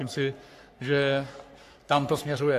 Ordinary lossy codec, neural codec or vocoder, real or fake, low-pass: AAC, 64 kbps; codec, 44.1 kHz, 3.4 kbps, Pupu-Codec; fake; 14.4 kHz